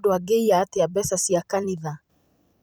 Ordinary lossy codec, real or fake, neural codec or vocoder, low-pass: none; fake; vocoder, 44.1 kHz, 128 mel bands every 256 samples, BigVGAN v2; none